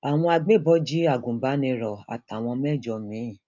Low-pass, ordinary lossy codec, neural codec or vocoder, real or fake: 7.2 kHz; none; none; real